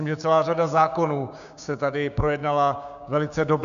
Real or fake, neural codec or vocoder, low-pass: fake; codec, 16 kHz, 6 kbps, DAC; 7.2 kHz